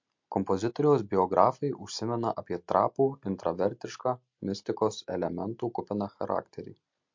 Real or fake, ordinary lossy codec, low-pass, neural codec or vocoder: real; MP3, 48 kbps; 7.2 kHz; none